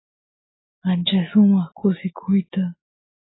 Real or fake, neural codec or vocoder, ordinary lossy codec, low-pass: real; none; AAC, 16 kbps; 7.2 kHz